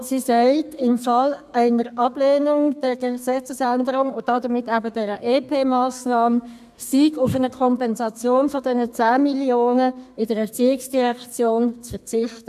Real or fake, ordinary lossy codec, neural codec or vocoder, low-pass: fake; AAC, 96 kbps; codec, 32 kHz, 1.9 kbps, SNAC; 14.4 kHz